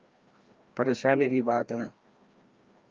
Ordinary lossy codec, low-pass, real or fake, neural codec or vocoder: Opus, 32 kbps; 7.2 kHz; fake; codec, 16 kHz, 1 kbps, FreqCodec, larger model